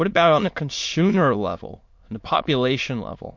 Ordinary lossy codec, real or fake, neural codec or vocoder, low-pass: MP3, 48 kbps; fake; autoencoder, 22.05 kHz, a latent of 192 numbers a frame, VITS, trained on many speakers; 7.2 kHz